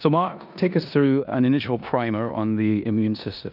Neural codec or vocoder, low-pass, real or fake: codec, 16 kHz in and 24 kHz out, 0.9 kbps, LongCat-Audio-Codec, four codebook decoder; 5.4 kHz; fake